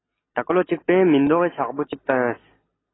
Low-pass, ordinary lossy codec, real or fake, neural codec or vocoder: 7.2 kHz; AAC, 16 kbps; real; none